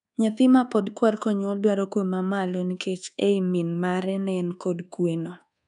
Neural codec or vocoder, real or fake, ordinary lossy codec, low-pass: codec, 24 kHz, 1.2 kbps, DualCodec; fake; none; 10.8 kHz